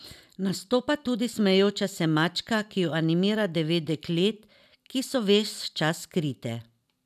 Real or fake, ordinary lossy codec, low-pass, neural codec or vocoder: real; none; 14.4 kHz; none